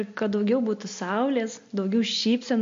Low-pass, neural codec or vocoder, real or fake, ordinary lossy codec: 7.2 kHz; none; real; MP3, 48 kbps